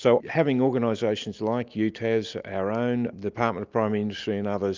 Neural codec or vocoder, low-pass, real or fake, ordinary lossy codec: none; 7.2 kHz; real; Opus, 24 kbps